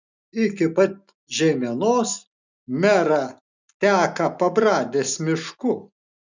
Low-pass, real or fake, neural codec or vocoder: 7.2 kHz; real; none